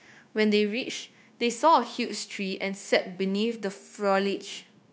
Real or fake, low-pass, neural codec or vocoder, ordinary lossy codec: fake; none; codec, 16 kHz, 0.9 kbps, LongCat-Audio-Codec; none